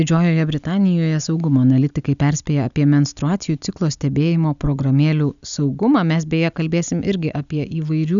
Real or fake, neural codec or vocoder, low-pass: real; none; 7.2 kHz